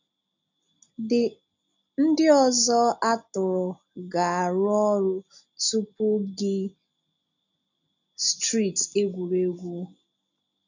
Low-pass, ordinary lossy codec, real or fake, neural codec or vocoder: 7.2 kHz; none; real; none